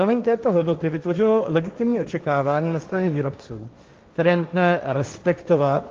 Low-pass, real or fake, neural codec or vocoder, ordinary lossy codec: 7.2 kHz; fake; codec, 16 kHz, 1.1 kbps, Voila-Tokenizer; Opus, 32 kbps